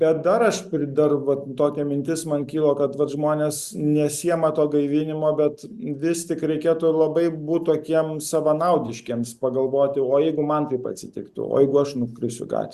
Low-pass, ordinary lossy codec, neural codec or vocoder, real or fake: 14.4 kHz; Opus, 64 kbps; none; real